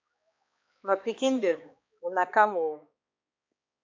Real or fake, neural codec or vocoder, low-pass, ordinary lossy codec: fake; codec, 16 kHz, 2 kbps, X-Codec, HuBERT features, trained on balanced general audio; 7.2 kHz; MP3, 64 kbps